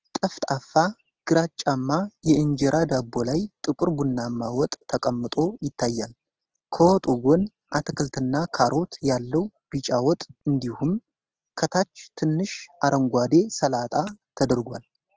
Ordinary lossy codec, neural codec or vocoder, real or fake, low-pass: Opus, 16 kbps; none; real; 7.2 kHz